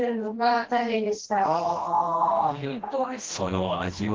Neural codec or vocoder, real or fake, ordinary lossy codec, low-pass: codec, 16 kHz, 1 kbps, FreqCodec, smaller model; fake; Opus, 16 kbps; 7.2 kHz